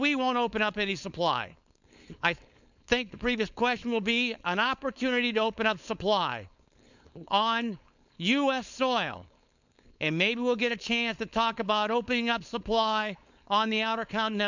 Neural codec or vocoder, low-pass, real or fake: codec, 16 kHz, 4.8 kbps, FACodec; 7.2 kHz; fake